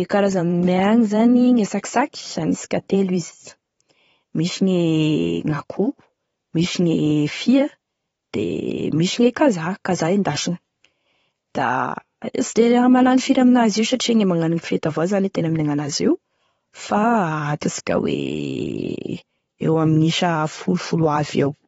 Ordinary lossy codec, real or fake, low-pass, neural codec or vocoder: AAC, 24 kbps; fake; 19.8 kHz; vocoder, 44.1 kHz, 128 mel bands every 512 samples, BigVGAN v2